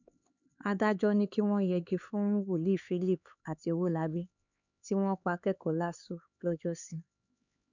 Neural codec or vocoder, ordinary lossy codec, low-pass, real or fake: codec, 16 kHz, 4 kbps, X-Codec, HuBERT features, trained on LibriSpeech; none; 7.2 kHz; fake